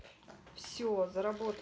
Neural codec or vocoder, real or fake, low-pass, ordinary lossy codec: none; real; none; none